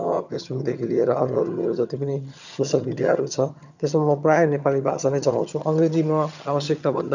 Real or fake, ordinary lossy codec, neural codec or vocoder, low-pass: fake; none; vocoder, 22.05 kHz, 80 mel bands, HiFi-GAN; 7.2 kHz